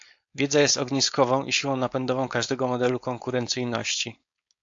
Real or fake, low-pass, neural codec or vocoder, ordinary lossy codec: fake; 7.2 kHz; codec, 16 kHz, 4.8 kbps, FACodec; AAC, 64 kbps